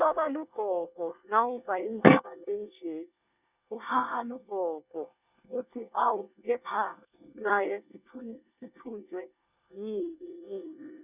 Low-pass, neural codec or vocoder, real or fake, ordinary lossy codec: 3.6 kHz; codec, 24 kHz, 1 kbps, SNAC; fake; none